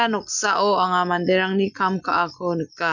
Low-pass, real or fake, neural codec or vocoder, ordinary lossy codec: 7.2 kHz; real; none; none